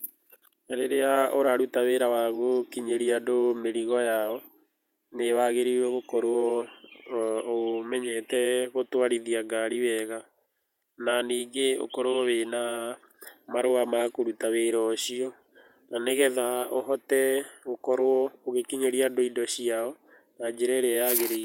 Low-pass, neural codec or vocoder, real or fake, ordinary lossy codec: 19.8 kHz; vocoder, 48 kHz, 128 mel bands, Vocos; fake; none